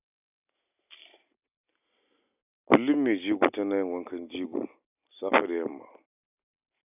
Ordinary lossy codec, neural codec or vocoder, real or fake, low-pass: none; none; real; 3.6 kHz